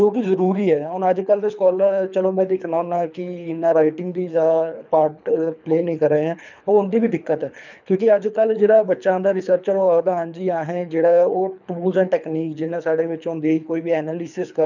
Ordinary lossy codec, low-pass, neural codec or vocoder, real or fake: none; 7.2 kHz; codec, 24 kHz, 3 kbps, HILCodec; fake